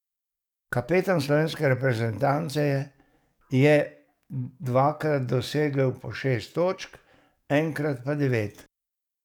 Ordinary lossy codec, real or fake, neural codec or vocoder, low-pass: none; fake; codec, 44.1 kHz, 7.8 kbps, DAC; 19.8 kHz